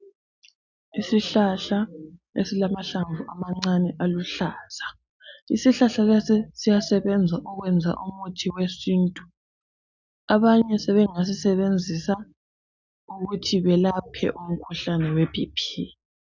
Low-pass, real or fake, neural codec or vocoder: 7.2 kHz; real; none